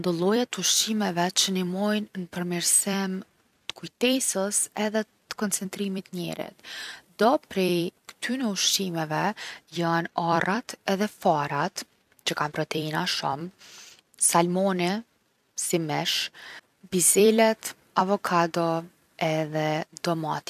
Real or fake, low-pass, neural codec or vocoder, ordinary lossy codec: fake; 14.4 kHz; vocoder, 44.1 kHz, 128 mel bands every 256 samples, BigVGAN v2; none